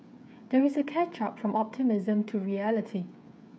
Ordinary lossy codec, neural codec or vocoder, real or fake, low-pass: none; codec, 16 kHz, 16 kbps, FreqCodec, smaller model; fake; none